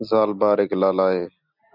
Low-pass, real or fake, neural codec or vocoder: 5.4 kHz; real; none